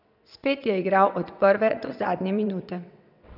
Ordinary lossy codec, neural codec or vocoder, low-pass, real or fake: none; vocoder, 22.05 kHz, 80 mel bands, WaveNeXt; 5.4 kHz; fake